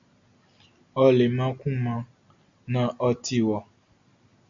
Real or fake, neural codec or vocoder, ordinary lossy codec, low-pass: real; none; AAC, 64 kbps; 7.2 kHz